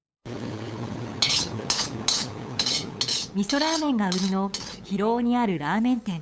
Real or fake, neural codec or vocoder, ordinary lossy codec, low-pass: fake; codec, 16 kHz, 8 kbps, FunCodec, trained on LibriTTS, 25 frames a second; none; none